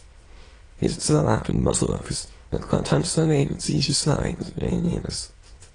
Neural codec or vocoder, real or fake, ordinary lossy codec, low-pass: autoencoder, 22.05 kHz, a latent of 192 numbers a frame, VITS, trained on many speakers; fake; AAC, 32 kbps; 9.9 kHz